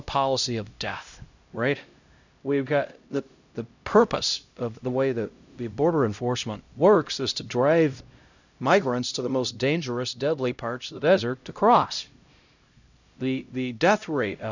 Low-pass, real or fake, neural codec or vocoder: 7.2 kHz; fake; codec, 16 kHz, 0.5 kbps, X-Codec, HuBERT features, trained on LibriSpeech